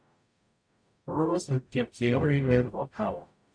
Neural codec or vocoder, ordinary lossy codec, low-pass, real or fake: codec, 44.1 kHz, 0.9 kbps, DAC; none; 9.9 kHz; fake